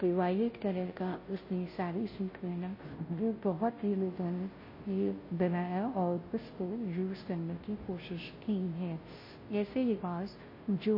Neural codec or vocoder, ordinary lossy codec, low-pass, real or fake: codec, 16 kHz, 0.5 kbps, FunCodec, trained on Chinese and English, 25 frames a second; MP3, 24 kbps; 5.4 kHz; fake